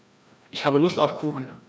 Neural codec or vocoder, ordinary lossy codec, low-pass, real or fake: codec, 16 kHz, 1 kbps, FreqCodec, larger model; none; none; fake